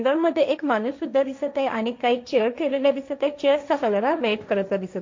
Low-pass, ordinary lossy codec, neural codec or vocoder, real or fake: 7.2 kHz; MP3, 48 kbps; codec, 16 kHz, 1.1 kbps, Voila-Tokenizer; fake